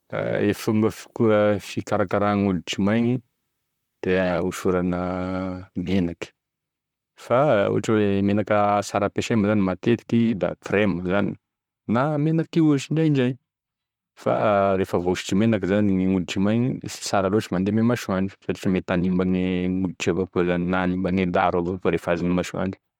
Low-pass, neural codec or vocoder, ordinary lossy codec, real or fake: 19.8 kHz; vocoder, 44.1 kHz, 128 mel bands, Pupu-Vocoder; MP3, 96 kbps; fake